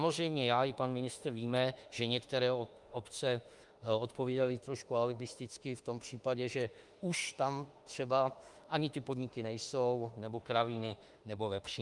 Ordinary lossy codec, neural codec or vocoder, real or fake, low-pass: Opus, 32 kbps; autoencoder, 48 kHz, 32 numbers a frame, DAC-VAE, trained on Japanese speech; fake; 10.8 kHz